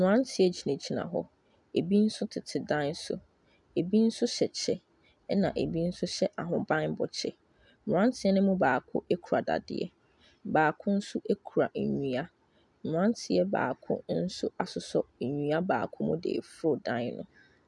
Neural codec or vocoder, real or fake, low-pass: none; real; 10.8 kHz